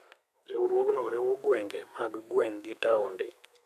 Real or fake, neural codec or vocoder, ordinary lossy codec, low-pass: fake; codec, 32 kHz, 1.9 kbps, SNAC; none; 14.4 kHz